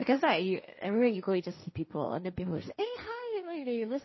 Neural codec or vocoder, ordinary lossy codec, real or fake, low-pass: codec, 16 kHz, 1.1 kbps, Voila-Tokenizer; MP3, 24 kbps; fake; 7.2 kHz